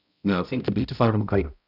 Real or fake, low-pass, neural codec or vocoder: fake; 5.4 kHz; codec, 16 kHz, 0.5 kbps, X-Codec, HuBERT features, trained on balanced general audio